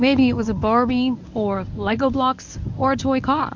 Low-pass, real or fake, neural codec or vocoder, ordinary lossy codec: 7.2 kHz; fake; codec, 24 kHz, 0.9 kbps, WavTokenizer, medium speech release version 1; MP3, 64 kbps